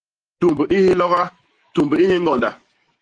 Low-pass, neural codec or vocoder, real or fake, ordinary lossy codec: 9.9 kHz; autoencoder, 48 kHz, 128 numbers a frame, DAC-VAE, trained on Japanese speech; fake; Opus, 24 kbps